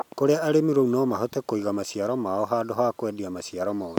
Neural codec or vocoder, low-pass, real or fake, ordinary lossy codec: none; 19.8 kHz; real; none